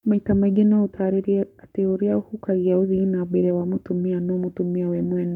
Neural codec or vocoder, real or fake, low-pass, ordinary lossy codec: codec, 44.1 kHz, 7.8 kbps, Pupu-Codec; fake; 19.8 kHz; none